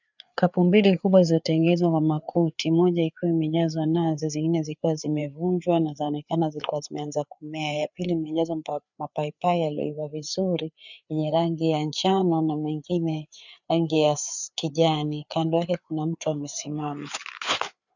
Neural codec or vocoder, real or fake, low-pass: codec, 16 kHz, 4 kbps, FreqCodec, larger model; fake; 7.2 kHz